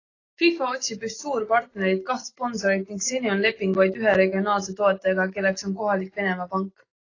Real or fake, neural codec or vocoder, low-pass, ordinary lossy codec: real; none; 7.2 kHz; AAC, 32 kbps